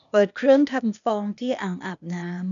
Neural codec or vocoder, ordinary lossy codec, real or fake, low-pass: codec, 16 kHz, 0.8 kbps, ZipCodec; none; fake; 7.2 kHz